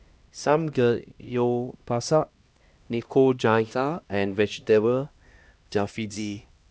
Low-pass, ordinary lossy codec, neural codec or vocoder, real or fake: none; none; codec, 16 kHz, 1 kbps, X-Codec, HuBERT features, trained on LibriSpeech; fake